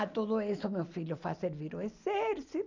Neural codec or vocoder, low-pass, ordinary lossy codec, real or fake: none; 7.2 kHz; none; real